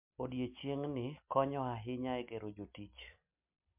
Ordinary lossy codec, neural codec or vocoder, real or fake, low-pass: MP3, 32 kbps; none; real; 3.6 kHz